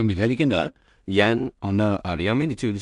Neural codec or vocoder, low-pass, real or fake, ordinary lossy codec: codec, 16 kHz in and 24 kHz out, 0.4 kbps, LongCat-Audio-Codec, two codebook decoder; 10.8 kHz; fake; none